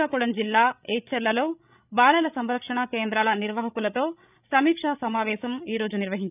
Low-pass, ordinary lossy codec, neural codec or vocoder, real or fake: 3.6 kHz; none; codec, 16 kHz, 16 kbps, FreqCodec, larger model; fake